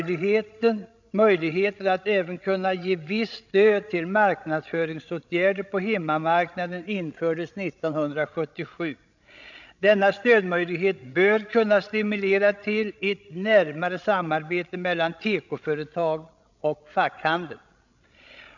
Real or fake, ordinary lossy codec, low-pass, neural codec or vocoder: fake; none; 7.2 kHz; codec, 16 kHz, 16 kbps, FreqCodec, larger model